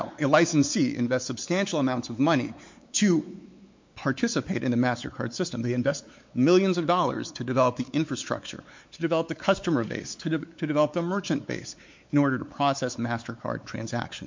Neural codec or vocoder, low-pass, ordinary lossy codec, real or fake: codec, 16 kHz, 4 kbps, X-Codec, WavLM features, trained on Multilingual LibriSpeech; 7.2 kHz; MP3, 48 kbps; fake